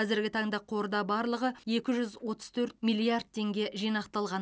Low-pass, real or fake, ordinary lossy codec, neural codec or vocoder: none; real; none; none